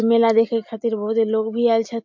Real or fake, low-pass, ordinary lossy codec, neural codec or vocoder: real; 7.2 kHz; MP3, 48 kbps; none